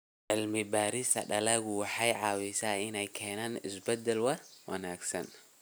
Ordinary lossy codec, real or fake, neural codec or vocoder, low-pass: none; real; none; none